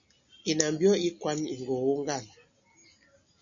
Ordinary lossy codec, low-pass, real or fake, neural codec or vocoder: MP3, 96 kbps; 7.2 kHz; real; none